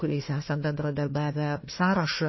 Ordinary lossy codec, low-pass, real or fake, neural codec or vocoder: MP3, 24 kbps; 7.2 kHz; fake; codec, 16 kHz, 1 kbps, FunCodec, trained on Chinese and English, 50 frames a second